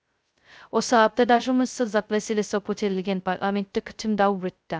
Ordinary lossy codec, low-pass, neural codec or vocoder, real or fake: none; none; codec, 16 kHz, 0.2 kbps, FocalCodec; fake